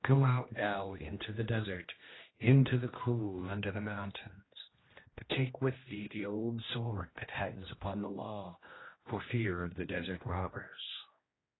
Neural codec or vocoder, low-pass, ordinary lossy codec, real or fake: codec, 16 kHz, 1 kbps, X-Codec, HuBERT features, trained on general audio; 7.2 kHz; AAC, 16 kbps; fake